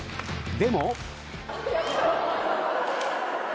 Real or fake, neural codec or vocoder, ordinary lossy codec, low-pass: real; none; none; none